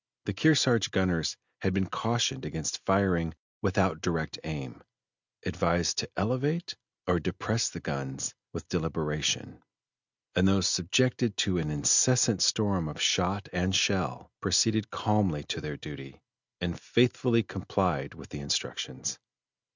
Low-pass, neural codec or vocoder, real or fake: 7.2 kHz; none; real